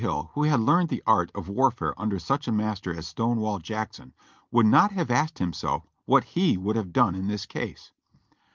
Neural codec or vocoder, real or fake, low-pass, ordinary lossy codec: none; real; 7.2 kHz; Opus, 32 kbps